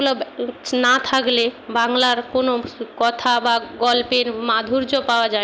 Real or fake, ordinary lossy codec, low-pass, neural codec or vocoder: real; none; none; none